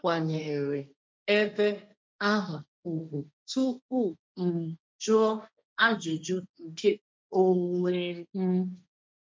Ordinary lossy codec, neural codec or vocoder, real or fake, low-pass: none; codec, 16 kHz, 1.1 kbps, Voila-Tokenizer; fake; none